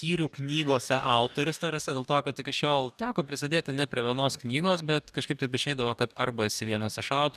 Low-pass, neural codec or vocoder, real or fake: 14.4 kHz; codec, 44.1 kHz, 2.6 kbps, DAC; fake